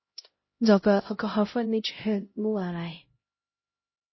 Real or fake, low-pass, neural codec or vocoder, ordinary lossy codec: fake; 7.2 kHz; codec, 16 kHz, 0.5 kbps, X-Codec, HuBERT features, trained on LibriSpeech; MP3, 24 kbps